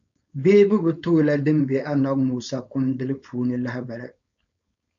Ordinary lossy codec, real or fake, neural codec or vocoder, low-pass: MP3, 64 kbps; fake; codec, 16 kHz, 4.8 kbps, FACodec; 7.2 kHz